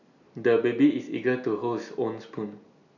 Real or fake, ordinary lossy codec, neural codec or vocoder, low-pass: real; none; none; 7.2 kHz